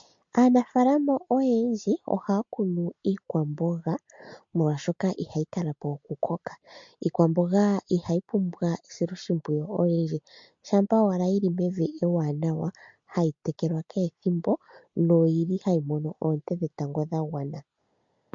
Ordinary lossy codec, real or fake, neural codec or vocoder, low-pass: MP3, 48 kbps; real; none; 7.2 kHz